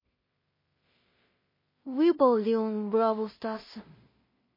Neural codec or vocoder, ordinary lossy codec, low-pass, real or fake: codec, 16 kHz in and 24 kHz out, 0.4 kbps, LongCat-Audio-Codec, two codebook decoder; MP3, 24 kbps; 5.4 kHz; fake